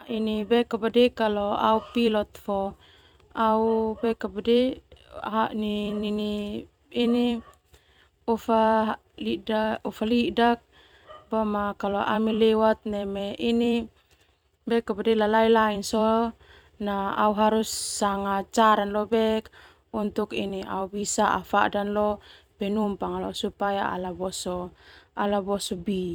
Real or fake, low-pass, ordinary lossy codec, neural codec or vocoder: fake; 19.8 kHz; none; vocoder, 44.1 kHz, 128 mel bands every 256 samples, BigVGAN v2